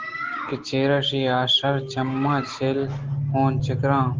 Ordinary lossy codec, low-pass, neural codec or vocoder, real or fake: Opus, 16 kbps; 7.2 kHz; none; real